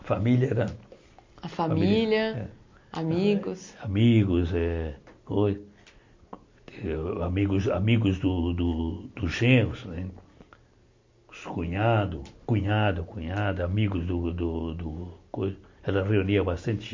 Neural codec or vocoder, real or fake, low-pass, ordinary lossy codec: none; real; 7.2 kHz; MP3, 48 kbps